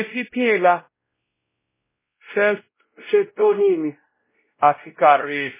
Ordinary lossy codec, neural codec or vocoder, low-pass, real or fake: MP3, 16 kbps; codec, 16 kHz, 0.5 kbps, X-Codec, WavLM features, trained on Multilingual LibriSpeech; 3.6 kHz; fake